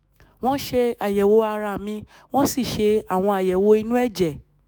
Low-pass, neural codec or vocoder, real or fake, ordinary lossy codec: none; autoencoder, 48 kHz, 128 numbers a frame, DAC-VAE, trained on Japanese speech; fake; none